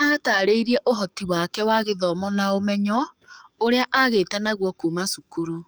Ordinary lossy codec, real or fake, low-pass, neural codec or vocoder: none; fake; none; codec, 44.1 kHz, 7.8 kbps, DAC